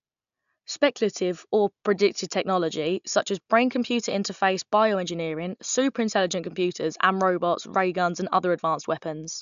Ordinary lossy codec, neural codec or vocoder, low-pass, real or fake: AAC, 96 kbps; none; 7.2 kHz; real